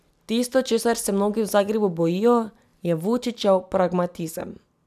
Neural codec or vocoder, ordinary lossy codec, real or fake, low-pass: none; none; real; 14.4 kHz